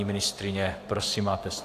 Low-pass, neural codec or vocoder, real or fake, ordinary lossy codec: 14.4 kHz; vocoder, 44.1 kHz, 128 mel bands every 512 samples, BigVGAN v2; fake; AAC, 64 kbps